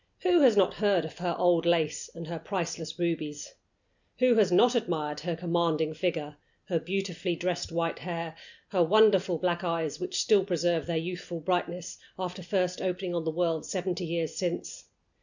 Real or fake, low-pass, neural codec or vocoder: real; 7.2 kHz; none